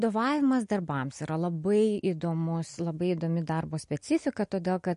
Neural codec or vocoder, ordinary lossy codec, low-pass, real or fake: none; MP3, 48 kbps; 10.8 kHz; real